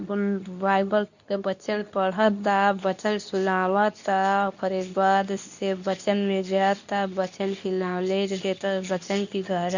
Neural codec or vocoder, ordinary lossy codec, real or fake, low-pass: codec, 24 kHz, 0.9 kbps, WavTokenizer, medium speech release version 2; none; fake; 7.2 kHz